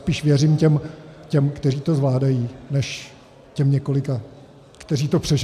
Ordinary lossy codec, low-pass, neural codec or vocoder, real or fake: AAC, 96 kbps; 14.4 kHz; none; real